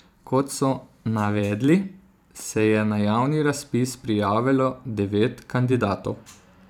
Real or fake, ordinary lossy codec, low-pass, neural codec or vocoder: fake; none; 19.8 kHz; vocoder, 44.1 kHz, 128 mel bands every 512 samples, BigVGAN v2